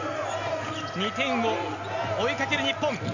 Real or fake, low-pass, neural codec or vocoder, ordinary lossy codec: real; 7.2 kHz; none; none